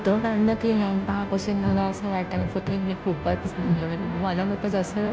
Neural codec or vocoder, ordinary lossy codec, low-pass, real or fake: codec, 16 kHz, 0.5 kbps, FunCodec, trained on Chinese and English, 25 frames a second; none; none; fake